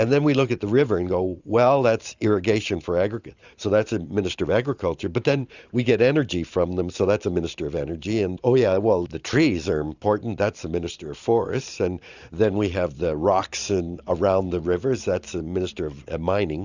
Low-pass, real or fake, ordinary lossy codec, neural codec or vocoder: 7.2 kHz; fake; Opus, 64 kbps; vocoder, 44.1 kHz, 128 mel bands every 512 samples, BigVGAN v2